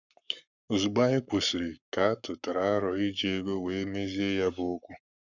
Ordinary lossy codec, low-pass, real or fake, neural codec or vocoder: none; 7.2 kHz; fake; codec, 44.1 kHz, 7.8 kbps, Pupu-Codec